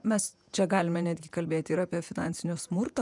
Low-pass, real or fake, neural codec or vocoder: 10.8 kHz; fake; vocoder, 48 kHz, 128 mel bands, Vocos